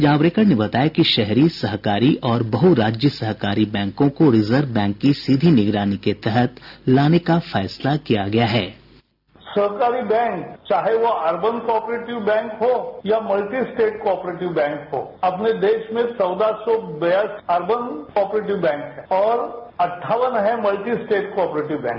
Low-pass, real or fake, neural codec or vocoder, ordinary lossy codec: 5.4 kHz; real; none; none